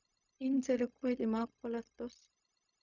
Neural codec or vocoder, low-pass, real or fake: codec, 16 kHz, 0.4 kbps, LongCat-Audio-Codec; 7.2 kHz; fake